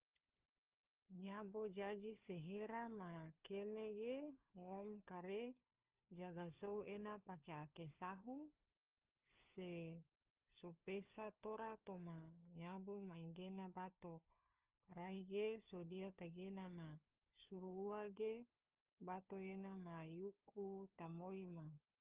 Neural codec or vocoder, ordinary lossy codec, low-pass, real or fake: codec, 16 kHz, 4 kbps, FunCodec, trained on LibriTTS, 50 frames a second; Opus, 32 kbps; 3.6 kHz; fake